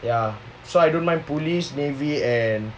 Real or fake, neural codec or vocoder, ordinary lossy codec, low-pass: real; none; none; none